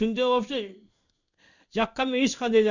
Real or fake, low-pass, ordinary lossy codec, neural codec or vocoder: fake; 7.2 kHz; none; codec, 16 kHz in and 24 kHz out, 1 kbps, XY-Tokenizer